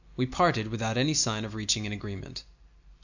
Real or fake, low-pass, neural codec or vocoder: real; 7.2 kHz; none